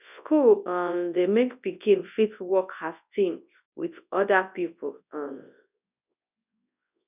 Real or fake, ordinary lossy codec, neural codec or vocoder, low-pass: fake; none; codec, 24 kHz, 0.9 kbps, WavTokenizer, large speech release; 3.6 kHz